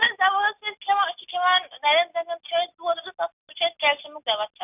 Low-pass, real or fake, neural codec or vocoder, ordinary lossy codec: 3.6 kHz; real; none; none